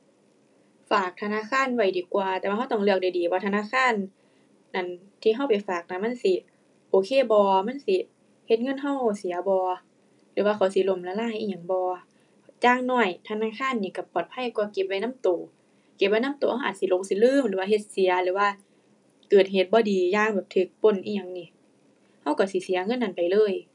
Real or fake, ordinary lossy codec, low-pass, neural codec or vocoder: real; none; 10.8 kHz; none